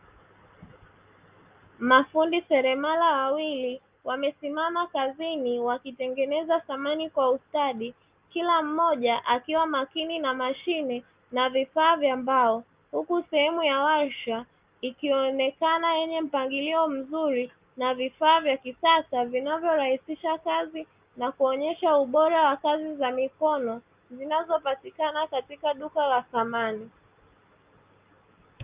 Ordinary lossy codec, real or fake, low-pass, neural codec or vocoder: Opus, 24 kbps; real; 3.6 kHz; none